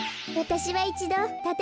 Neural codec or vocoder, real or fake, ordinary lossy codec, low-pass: none; real; none; none